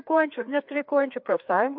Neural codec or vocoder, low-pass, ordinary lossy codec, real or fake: codec, 16 kHz, 2 kbps, FreqCodec, larger model; 5.4 kHz; MP3, 48 kbps; fake